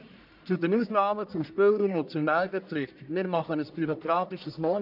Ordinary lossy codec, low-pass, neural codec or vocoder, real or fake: MP3, 48 kbps; 5.4 kHz; codec, 44.1 kHz, 1.7 kbps, Pupu-Codec; fake